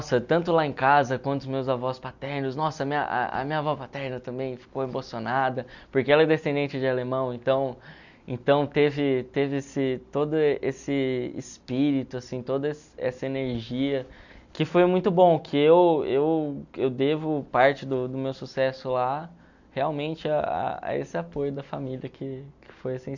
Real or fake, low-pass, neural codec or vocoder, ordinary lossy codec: real; 7.2 kHz; none; none